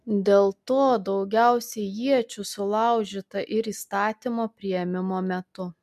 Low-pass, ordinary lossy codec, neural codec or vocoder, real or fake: 14.4 kHz; MP3, 96 kbps; none; real